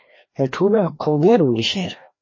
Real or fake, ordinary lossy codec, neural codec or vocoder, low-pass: fake; MP3, 32 kbps; codec, 16 kHz, 1 kbps, FreqCodec, larger model; 7.2 kHz